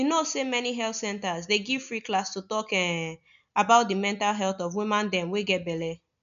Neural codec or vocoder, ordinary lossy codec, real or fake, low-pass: none; none; real; 7.2 kHz